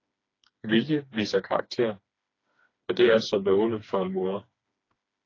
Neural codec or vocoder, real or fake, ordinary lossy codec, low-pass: codec, 16 kHz, 2 kbps, FreqCodec, smaller model; fake; AAC, 32 kbps; 7.2 kHz